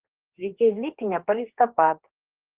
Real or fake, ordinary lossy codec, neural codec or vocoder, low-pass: fake; Opus, 16 kbps; codec, 16 kHz, 2 kbps, X-Codec, HuBERT features, trained on general audio; 3.6 kHz